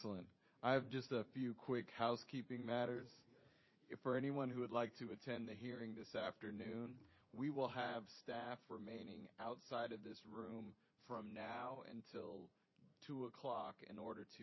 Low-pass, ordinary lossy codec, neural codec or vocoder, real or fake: 7.2 kHz; MP3, 24 kbps; vocoder, 44.1 kHz, 80 mel bands, Vocos; fake